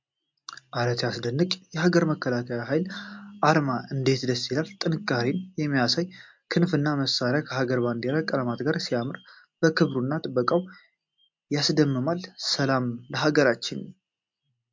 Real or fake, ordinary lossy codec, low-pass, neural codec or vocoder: real; MP3, 64 kbps; 7.2 kHz; none